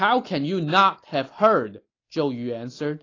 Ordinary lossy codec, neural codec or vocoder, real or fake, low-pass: AAC, 32 kbps; none; real; 7.2 kHz